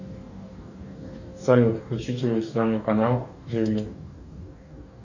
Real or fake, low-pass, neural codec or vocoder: fake; 7.2 kHz; codec, 44.1 kHz, 2.6 kbps, DAC